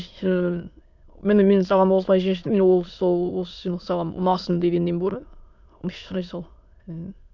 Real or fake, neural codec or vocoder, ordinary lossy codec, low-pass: fake; autoencoder, 22.05 kHz, a latent of 192 numbers a frame, VITS, trained on many speakers; none; 7.2 kHz